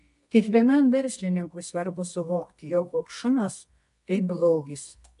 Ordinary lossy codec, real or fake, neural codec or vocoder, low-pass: MP3, 64 kbps; fake; codec, 24 kHz, 0.9 kbps, WavTokenizer, medium music audio release; 10.8 kHz